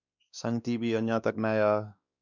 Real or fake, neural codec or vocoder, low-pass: fake; codec, 16 kHz, 2 kbps, X-Codec, WavLM features, trained on Multilingual LibriSpeech; 7.2 kHz